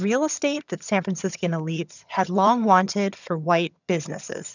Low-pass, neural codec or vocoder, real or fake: 7.2 kHz; vocoder, 22.05 kHz, 80 mel bands, HiFi-GAN; fake